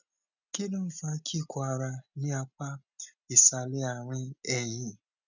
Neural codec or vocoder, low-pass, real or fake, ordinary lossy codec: none; 7.2 kHz; real; none